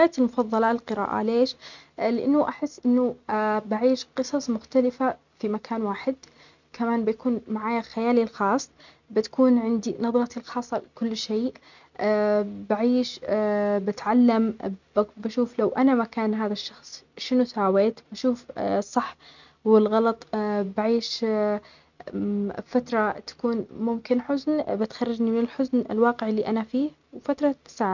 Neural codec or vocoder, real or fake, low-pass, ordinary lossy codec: none; real; 7.2 kHz; none